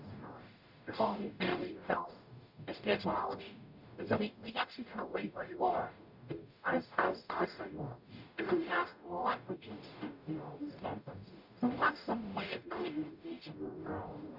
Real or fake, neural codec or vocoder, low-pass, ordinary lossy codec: fake; codec, 44.1 kHz, 0.9 kbps, DAC; 5.4 kHz; Opus, 64 kbps